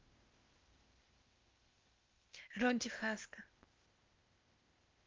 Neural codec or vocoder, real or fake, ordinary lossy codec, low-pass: codec, 16 kHz, 0.8 kbps, ZipCodec; fake; Opus, 32 kbps; 7.2 kHz